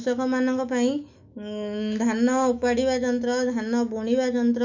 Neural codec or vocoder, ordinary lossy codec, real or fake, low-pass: autoencoder, 48 kHz, 128 numbers a frame, DAC-VAE, trained on Japanese speech; none; fake; 7.2 kHz